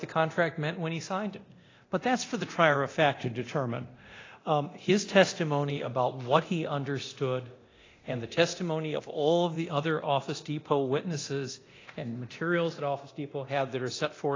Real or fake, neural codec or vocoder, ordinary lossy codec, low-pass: fake; codec, 24 kHz, 0.9 kbps, DualCodec; AAC, 32 kbps; 7.2 kHz